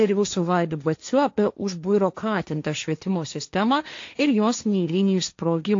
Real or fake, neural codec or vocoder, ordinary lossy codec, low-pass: fake; codec, 16 kHz, 1.1 kbps, Voila-Tokenizer; AAC, 48 kbps; 7.2 kHz